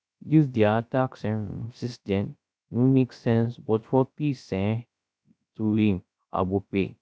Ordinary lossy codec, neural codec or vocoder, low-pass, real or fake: none; codec, 16 kHz, 0.3 kbps, FocalCodec; none; fake